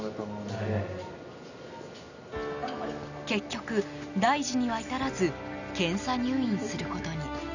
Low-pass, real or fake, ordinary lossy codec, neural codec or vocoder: 7.2 kHz; real; none; none